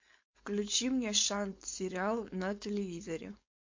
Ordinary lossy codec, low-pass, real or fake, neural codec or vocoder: MP3, 48 kbps; 7.2 kHz; fake; codec, 16 kHz, 4.8 kbps, FACodec